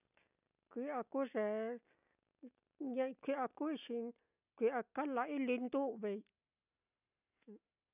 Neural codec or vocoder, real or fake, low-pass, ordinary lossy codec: none; real; 3.6 kHz; none